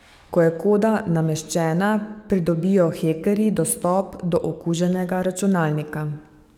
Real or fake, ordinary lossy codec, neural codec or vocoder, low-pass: fake; none; codec, 44.1 kHz, 7.8 kbps, DAC; 19.8 kHz